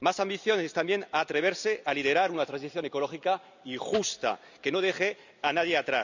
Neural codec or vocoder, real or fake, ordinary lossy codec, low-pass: none; real; none; 7.2 kHz